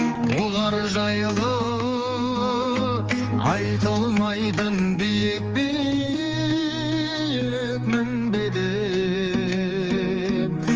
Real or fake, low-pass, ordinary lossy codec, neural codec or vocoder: fake; 7.2 kHz; Opus, 24 kbps; codec, 16 kHz, 4 kbps, X-Codec, HuBERT features, trained on general audio